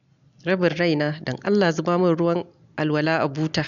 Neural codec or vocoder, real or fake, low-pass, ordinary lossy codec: none; real; 7.2 kHz; none